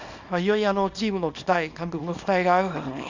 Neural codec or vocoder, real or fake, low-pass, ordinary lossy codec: codec, 24 kHz, 0.9 kbps, WavTokenizer, small release; fake; 7.2 kHz; Opus, 64 kbps